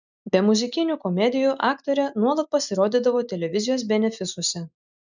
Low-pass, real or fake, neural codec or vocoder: 7.2 kHz; real; none